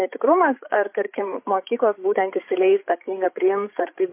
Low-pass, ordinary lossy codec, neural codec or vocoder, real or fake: 3.6 kHz; MP3, 24 kbps; codec, 16 kHz, 8 kbps, FreqCodec, larger model; fake